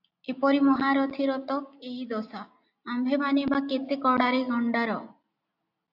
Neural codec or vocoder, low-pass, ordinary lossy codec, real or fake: none; 5.4 kHz; AAC, 48 kbps; real